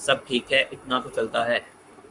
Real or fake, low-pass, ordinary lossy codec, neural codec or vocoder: fake; 10.8 kHz; Opus, 64 kbps; codec, 44.1 kHz, 7.8 kbps, Pupu-Codec